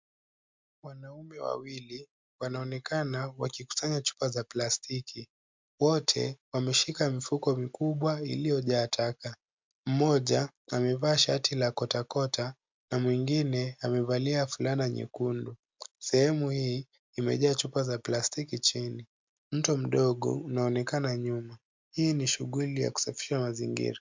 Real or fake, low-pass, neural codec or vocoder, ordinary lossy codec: real; 7.2 kHz; none; MP3, 64 kbps